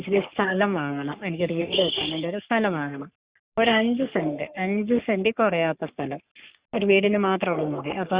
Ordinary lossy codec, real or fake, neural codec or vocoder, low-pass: Opus, 64 kbps; fake; codec, 44.1 kHz, 3.4 kbps, Pupu-Codec; 3.6 kHz